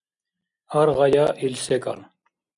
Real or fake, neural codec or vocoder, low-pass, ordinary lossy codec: real; none; 10.8 kHz; MP3, 96 kbps